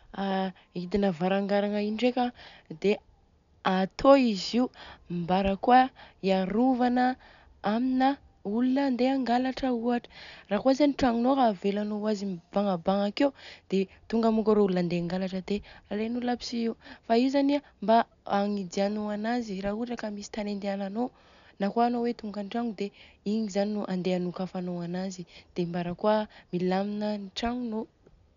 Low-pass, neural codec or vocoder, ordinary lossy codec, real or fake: 7.2 kHz; none; none; real